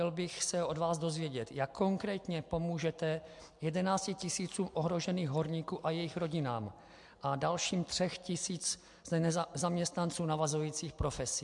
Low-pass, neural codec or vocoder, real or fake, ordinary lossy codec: 10.8 kHz; none; real; MP3, 64 kbps